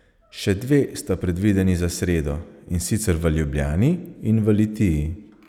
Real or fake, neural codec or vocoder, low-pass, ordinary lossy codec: real; none; 19.8 kHz; none